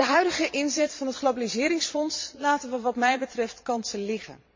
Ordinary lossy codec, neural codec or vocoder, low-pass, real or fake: MP3, 32 kbps; vocoder, 22.05 kHz, 80 mel bands, Vocos; 7.2 kHz; fake